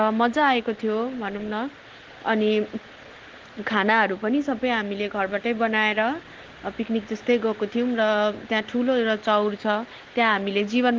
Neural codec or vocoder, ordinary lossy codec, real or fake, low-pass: none; Opus, 16 kbps; real; 7.2 kHz